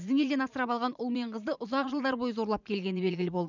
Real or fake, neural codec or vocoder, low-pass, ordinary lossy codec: fake; vocoder, 44.1 kHz, 80 mel bands, Vocos; 7.2 kHz; none